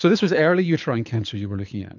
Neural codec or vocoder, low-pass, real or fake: codec, 24 kHz, 6 kbps, HILCodec; 7.2 kHz; fake